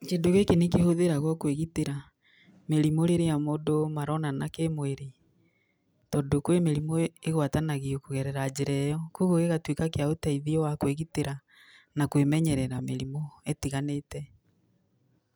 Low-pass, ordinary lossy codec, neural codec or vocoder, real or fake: none; none; none; real